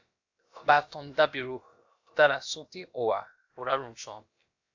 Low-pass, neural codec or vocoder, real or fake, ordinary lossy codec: 7.2 kHz; codec, 16 kHz, about 1 kbps, DyCAST, with the encoder's durations; fake; AAC, 48 kbps